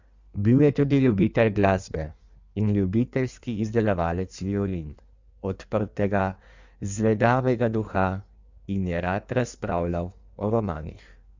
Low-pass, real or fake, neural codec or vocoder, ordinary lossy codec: 7.2 kHz; fake; codec, 16 kHz in and 24 kHz out, 1.1 kbps, FireRedTTS-2 codec; Opus, 64 kbps